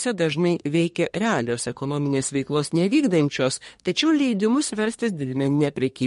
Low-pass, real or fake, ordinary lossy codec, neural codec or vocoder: 10.8 kHz; fake; MP3, 48 kbps; codec, 24 kHz, 1 kbps, SNAC